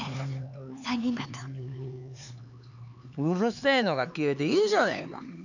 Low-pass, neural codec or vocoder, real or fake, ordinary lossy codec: 7.2 kHz; codec, 16 kHz, 4 kbps, X-Codec, HuBERT features, trained on LibriSpeech; fake; none